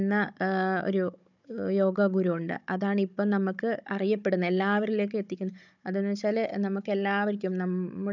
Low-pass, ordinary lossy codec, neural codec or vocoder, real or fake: 7.2 kHz; none; codec, 16 kHz, 16 kbps, FunCodec, trained on Chinese and English, 50 frames a second; fake